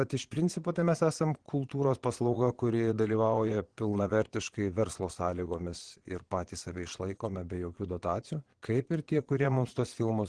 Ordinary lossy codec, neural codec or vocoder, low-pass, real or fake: Opus, 16 kbps; vocoder, 22.05 kHz, 80 mel bands, Vocos; 9.9 kHz; fake